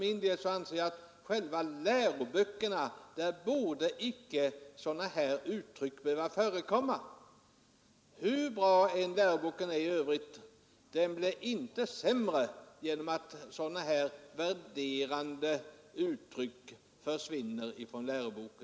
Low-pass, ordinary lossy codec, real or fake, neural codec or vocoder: none; none; real; none